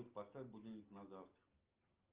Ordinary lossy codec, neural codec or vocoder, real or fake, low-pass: Opus, 24 kbps; none; real; 3.6 kHz